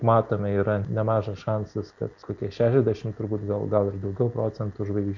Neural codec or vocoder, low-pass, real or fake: none; 7.2 kHz; real